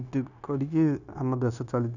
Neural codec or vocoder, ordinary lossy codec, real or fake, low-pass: codec, 16 kHz, 0.9 kbps, LongCat-Audio-Codec; none; fake; 7.2 kHz